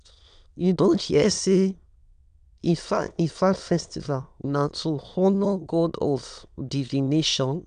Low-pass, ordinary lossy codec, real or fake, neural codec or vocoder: 9.9 kHz; none; fake; autoencoder, 22.05 kHz, a latent of 192 numbers a frame, VITS, trained on many speakers